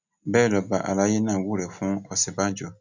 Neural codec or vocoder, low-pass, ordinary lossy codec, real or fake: none; 7.2 kHz; none; real